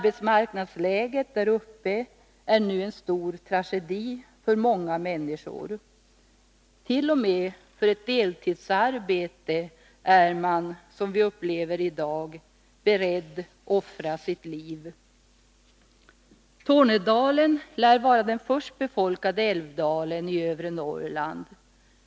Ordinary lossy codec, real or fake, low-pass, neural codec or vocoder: none; real; none; none